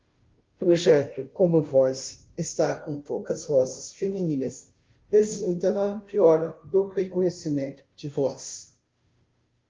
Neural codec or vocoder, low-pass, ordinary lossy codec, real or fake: codec, 16 kHz, 0.5 kbps, FunCodec, trained on Chinese and English, 25 frames a second; 7.2 kHz; Opus, 32 kbps; fake